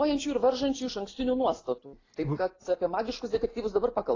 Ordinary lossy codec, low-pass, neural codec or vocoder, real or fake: AAC, 32 kbps; 7.2 kHz; none; real